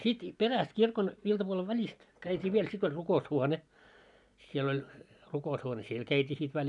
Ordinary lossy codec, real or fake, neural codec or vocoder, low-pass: none; real; none; 10.8 kHz